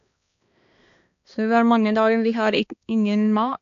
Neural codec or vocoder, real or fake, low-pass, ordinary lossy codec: codec, 16 kHz, 2 kbps, X-Codec, HuBERT features, trained on balanced general audio; fake; 7.2 kHz; AAC, 48 kbps